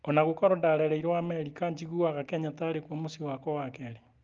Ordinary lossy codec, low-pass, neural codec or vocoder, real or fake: Opus, 32 kbps; 7.2 kHz; none; real